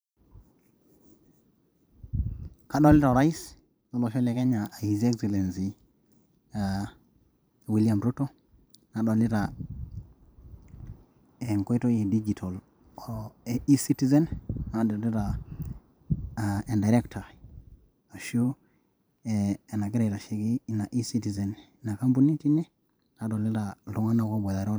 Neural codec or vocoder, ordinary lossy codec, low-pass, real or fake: vocoder, 44.1 kHz, 128 mel bands every 512 samples, BigVGAN v2; none; none; fake